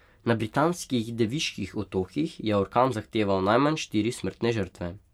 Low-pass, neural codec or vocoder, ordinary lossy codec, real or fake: 19.8 kHz; none; MP3, 96 kbps; real